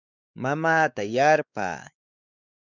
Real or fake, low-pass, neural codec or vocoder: fake; 7.2 kHz; codec, 16 kHz, 4 kbps, X-Codec, WavLM features, trained on Multilingual LibriSpeech